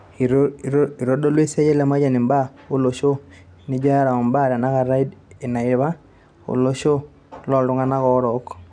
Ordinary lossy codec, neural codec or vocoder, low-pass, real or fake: none; none; 9.9 kHz; real